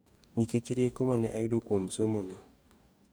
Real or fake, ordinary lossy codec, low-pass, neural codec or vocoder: fake; none; none; codec, 44.1 kHz, 2.6 kbps, DAC